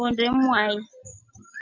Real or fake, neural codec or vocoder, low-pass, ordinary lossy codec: real; none; 7.2 kHz; MP3, 64 kbps